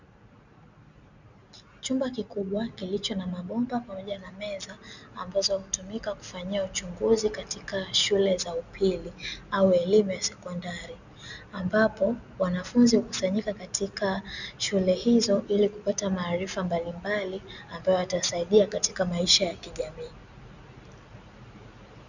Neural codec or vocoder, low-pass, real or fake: none; 7.2 kHz; real